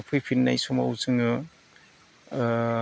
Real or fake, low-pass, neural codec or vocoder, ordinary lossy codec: real; none; none; none